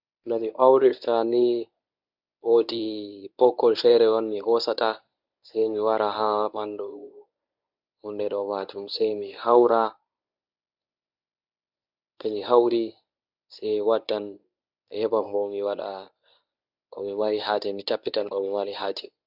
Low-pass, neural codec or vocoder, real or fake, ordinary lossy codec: 5.4 kHz; codec, 24 kHz, 0.9 kbps, WavTokenizer, medium speech release version 1; fake; none